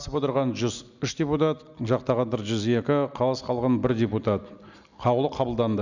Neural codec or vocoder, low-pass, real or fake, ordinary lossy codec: none; 7.2 kHz; real; none